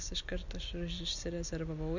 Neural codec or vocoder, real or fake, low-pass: none; real; 7.2 kHz